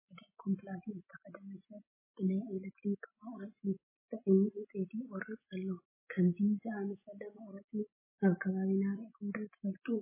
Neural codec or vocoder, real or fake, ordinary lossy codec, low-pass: none; real; MP3, 16 kbps; 3.6 kHz